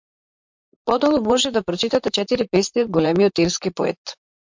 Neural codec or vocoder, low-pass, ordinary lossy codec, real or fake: vocoder, 44.1 kHz, 128 mel bands, Pupu-Vocoder; 7.2 kHz; MP3, 64 kbps; fake